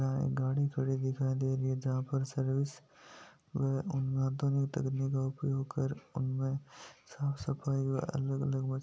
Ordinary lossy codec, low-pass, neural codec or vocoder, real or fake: none; none; none; real